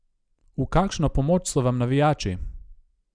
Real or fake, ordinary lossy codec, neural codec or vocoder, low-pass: real; none; none; 9.9 kHz